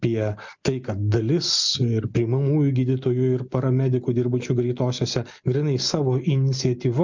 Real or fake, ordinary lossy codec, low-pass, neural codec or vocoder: real; MP3, 64 kbps; 7.2 kHz; none